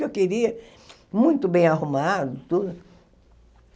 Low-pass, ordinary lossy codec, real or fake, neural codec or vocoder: none; none; real; none